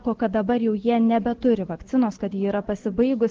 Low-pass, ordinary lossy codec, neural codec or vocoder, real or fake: 7.2 kHz; Opus, 16 kbps; none; real